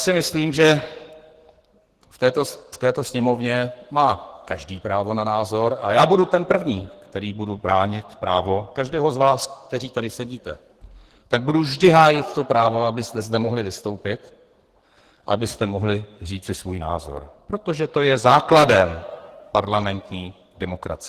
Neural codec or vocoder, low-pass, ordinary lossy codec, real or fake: codec, 44.1 kHz, 2.6 kbps, SNAC; 14.4 kHz; Opus, 16 kbps; fake